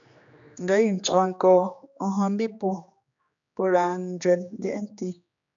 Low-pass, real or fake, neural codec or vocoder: 7.2 kHz; fake; codec, 16 kHz, 2 kbps, X-Codec, HuBERT features, trained on general audio